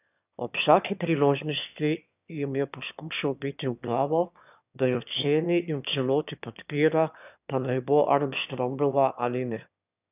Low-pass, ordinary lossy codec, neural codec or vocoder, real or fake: 3.6 kHz; none; autoencoder, 22.05 kHz, a latent of 192 numbers a frame, VITS, trained on one speaker; fake